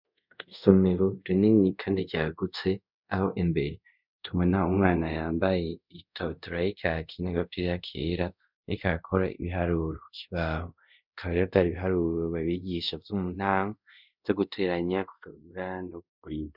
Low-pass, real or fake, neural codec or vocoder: 5.4 kHz; fake; codec, 24 kHz, 0.5 kbps, DualCodec